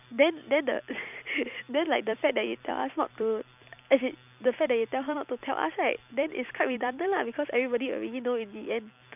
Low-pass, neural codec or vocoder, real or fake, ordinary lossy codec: 3.6 kHz; vocoder, 44.1 kHz, 128 mel bands every 256 samples, BigVGAN v2; fake; none